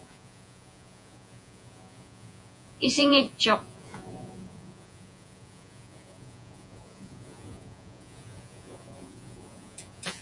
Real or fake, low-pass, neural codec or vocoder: fake; 10.8 kHz; vocoder, 48 kHz, 128 mel bands, Vocos